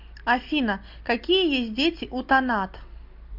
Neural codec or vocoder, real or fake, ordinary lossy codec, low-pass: none; real; MP3, 48 kbps; 5.4 kHz